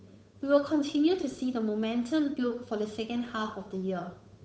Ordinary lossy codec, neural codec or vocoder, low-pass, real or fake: none; codec, 16 kHz, 8 kbps, FunCodec, trained on Chinese and English, 25 frames a second; none; fake